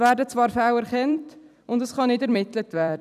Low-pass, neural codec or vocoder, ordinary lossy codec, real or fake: 14.4 kHz; vocoder, 44.1 kHz, 128 mel bands every 256 samples, BigVGAN v2; none; fake